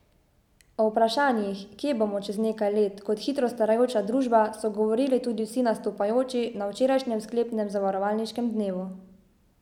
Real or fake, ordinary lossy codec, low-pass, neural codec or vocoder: real; none; 19.8 kHz; none